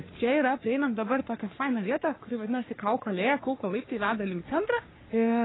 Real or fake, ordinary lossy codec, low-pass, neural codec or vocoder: fake; AAC, 16 kbps; 7.2 kHz; codec, 44.1 kHz, 3.4 kbps, Pupu-Codec